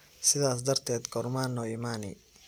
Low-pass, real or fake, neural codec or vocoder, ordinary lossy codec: none; real; none; none